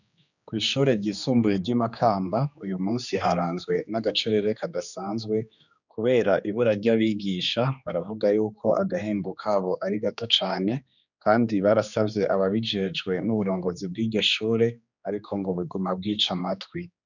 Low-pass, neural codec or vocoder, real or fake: 7.2 kHz; codec, 16 kHz, 2 kbps, X-Codec, HuBERT features, trained on general audio; fake